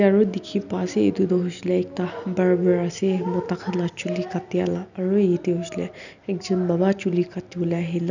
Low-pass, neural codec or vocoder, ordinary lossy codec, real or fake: 7.2 kHz; none; none; real